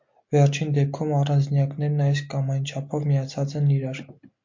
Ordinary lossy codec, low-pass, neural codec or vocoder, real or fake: MP3, 48 kbps; 7.2 kHz; none; real